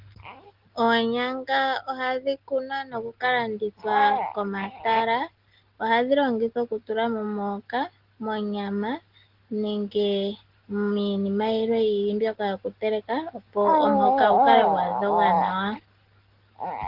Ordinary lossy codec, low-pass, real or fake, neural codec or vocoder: Opus, 16 kbps; 5.4 kHz; real; none